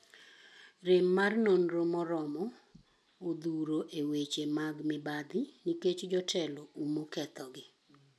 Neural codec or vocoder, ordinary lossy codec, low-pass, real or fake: none; none; none; real